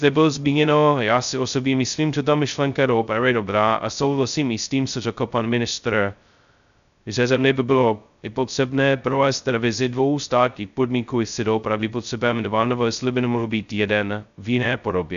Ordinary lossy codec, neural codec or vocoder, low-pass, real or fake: MP3, 96 kbps; codec, 16 kHz, 0.2 kbps, FocalCodec; 7.2 kHz; fake